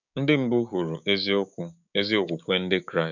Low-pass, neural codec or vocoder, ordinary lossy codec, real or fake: 7.2 kHz; codec, 16 kHz, 6 kbps, DAC; none; fake